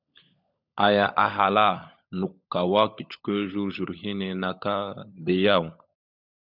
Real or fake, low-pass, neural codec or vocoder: fake; 5.4 kHz; codec, 16 kHz, 16 kbps, FunCodec, trained on LibriTTS, 50 frames a second